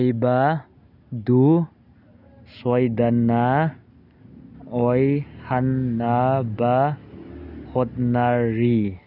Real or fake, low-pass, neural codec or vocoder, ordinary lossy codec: real; 5.4 kHz; none; none